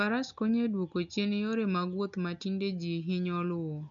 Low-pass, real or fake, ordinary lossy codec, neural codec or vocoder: 7.2 kHz; real; none; none